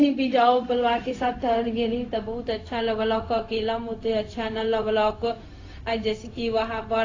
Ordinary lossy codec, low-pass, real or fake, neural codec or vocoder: AAC, 32 kbps; 7.2 kHz; fake; codec, 16 kHz, 0.4 kbps, LongCat-Audio-Codec